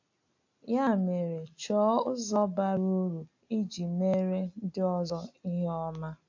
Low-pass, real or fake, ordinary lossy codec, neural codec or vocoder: 7.2 kHz; real; AAC, 48 kbps; none